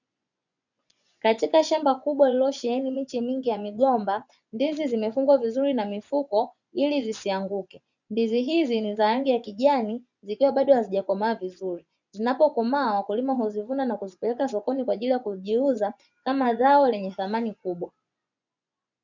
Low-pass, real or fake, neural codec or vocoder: 7.2 kHz; fake; vocoder, 24 kHz, 100 mel bands, Vocos